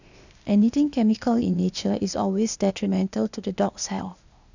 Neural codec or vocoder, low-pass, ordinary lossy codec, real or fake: codec, 16 kHz, 0.8 kbps, ZipCodec; 7.2 kHz; none; fake